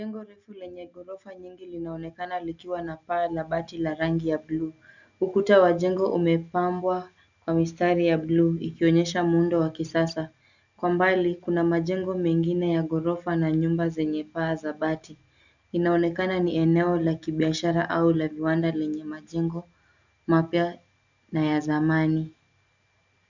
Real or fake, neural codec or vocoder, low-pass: real; none; 7.2 kHz